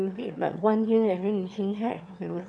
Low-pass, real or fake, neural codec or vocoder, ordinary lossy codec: none; fake; autoencoder, 22.05 kHz, a latent of 192 numbers a frame, VITS, trained on one speaker; none